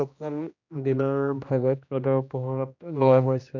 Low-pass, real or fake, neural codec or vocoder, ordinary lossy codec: 7.2 kHz; fake; codec, 16 kHz, 1 kbps, X-Codec, HuBERT features, trained on general audio; none